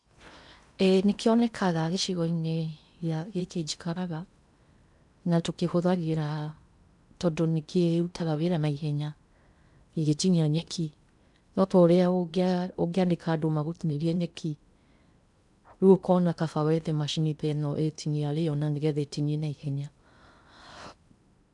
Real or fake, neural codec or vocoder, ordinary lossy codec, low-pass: fake; codec, 16 kHz in and 24 kHz out, 0.8 kbps, FocalCodec, streaming, 65536 codes; none; 10.8 kHz